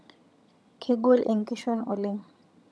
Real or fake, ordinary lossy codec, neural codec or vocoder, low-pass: fake; none; vocoder, 22.05 kHz, 80 mel bands, HiFi-GAN; none